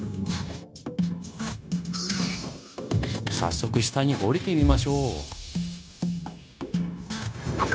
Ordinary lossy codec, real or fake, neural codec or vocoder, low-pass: none; fake; codec, 16 kHz, 0.9 kbps, LongCat-Audio-Codec; none